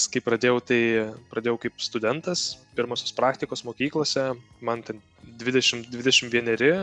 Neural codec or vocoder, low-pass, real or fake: none; 10.8 kHz; real